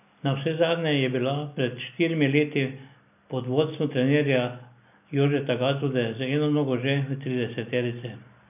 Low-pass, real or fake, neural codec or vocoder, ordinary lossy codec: 3.6 kHz; real; none; none